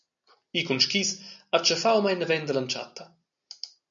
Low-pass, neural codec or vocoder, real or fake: 7.2 kHz; none; real